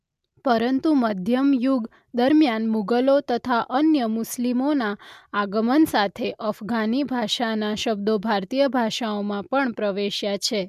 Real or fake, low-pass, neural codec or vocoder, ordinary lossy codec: real; 14.4 kHz; none; MP3, 96 kbps